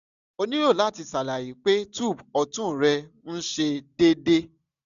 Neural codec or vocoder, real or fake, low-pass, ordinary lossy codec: none; real; 7.2 kHz; none